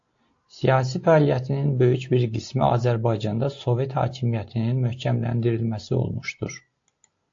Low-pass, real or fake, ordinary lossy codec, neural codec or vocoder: 7.2 kHz; real; AAC, 48 kbps; none